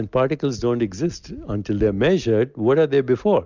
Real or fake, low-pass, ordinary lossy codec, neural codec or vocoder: real; 7.2 kHz; Opus, 64 kbps; none